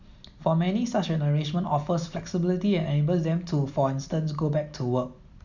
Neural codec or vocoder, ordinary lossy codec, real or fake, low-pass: none; none; real; 7.2 kHz